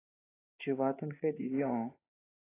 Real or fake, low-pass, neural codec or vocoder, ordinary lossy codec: fake; 3.6 kHz; vocoder, 24 kHz, 100 mel bands, Vocos; AAC, 16 kbps